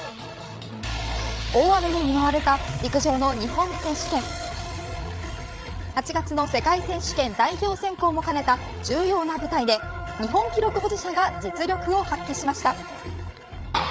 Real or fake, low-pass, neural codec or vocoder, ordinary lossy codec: fake; none; codec, 16 kHz, 8 kbps, FreqCodec, larger model; none